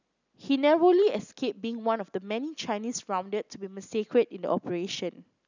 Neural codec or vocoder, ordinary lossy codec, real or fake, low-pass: none; none; real; 7.2 kHz